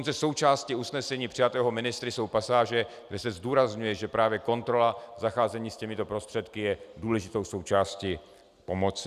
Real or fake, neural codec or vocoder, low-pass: real; none; 14.4 kHz